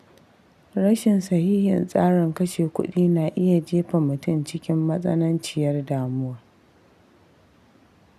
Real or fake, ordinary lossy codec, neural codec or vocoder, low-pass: real; none; none; 14.4 kHz